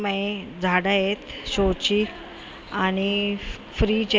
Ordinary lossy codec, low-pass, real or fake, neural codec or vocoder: none; none; real; none